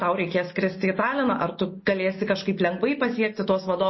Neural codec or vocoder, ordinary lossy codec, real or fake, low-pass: none; MP3, 24 kbps; real; 7.2 kHz